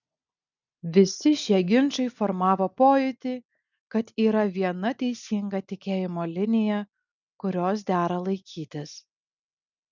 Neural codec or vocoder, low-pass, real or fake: none; 7.2 kHz; real